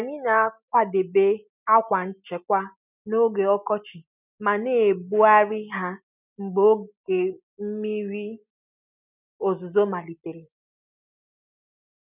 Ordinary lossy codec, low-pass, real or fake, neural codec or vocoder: none; 3.6 kHz; real; none